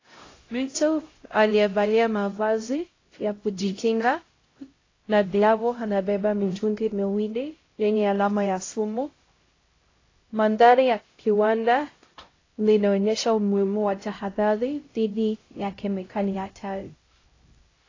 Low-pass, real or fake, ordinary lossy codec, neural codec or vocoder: 7.2 kHz; fake; AAC, 32 kbps; codec, 16 kHz, 0.5 kbps, X-Codec, HuBERT features, trained on LibriSpeech